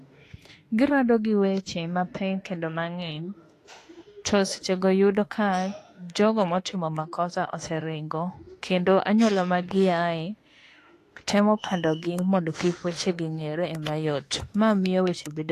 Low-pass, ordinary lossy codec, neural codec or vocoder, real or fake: 14.4 kHz; AAC, 48 kbps; autoencoder, 48 kHz, 32 numbers a frame, DAC-VAE, trained on Japanese speech; fake